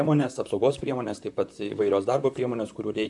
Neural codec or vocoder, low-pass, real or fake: vocoder, 44.1 kHz, 128 mel bands, Pupu-Vocoder; 10.8 kHz; fake